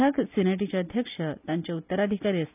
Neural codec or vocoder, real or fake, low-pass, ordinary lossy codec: none; real; 3.6 kHz; none